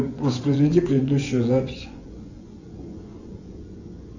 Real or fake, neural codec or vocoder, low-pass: fake; vocoder, 24 kHz, 100 mel bands, Vocos; 7.2 kHz